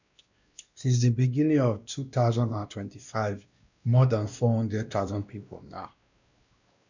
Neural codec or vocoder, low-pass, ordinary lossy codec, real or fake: codec, 16 kHz, 1 kbps, X-Codec, WavLM features, trained on Multilingual LibriSpeech; 7.2 kHz; none; fake